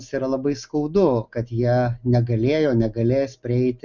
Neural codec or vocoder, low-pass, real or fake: none; 7.2 kHz; real